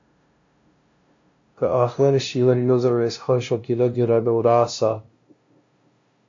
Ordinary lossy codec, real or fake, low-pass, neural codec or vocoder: AAC, 48 kbps; fake; 7.2 kHz; codec, 16 kHz, 0.5 kbps, FunCodec, trained on LibriTTS, 25 frames a second